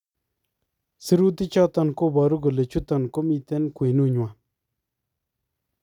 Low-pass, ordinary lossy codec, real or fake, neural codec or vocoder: 19.8 kHz; none; real; none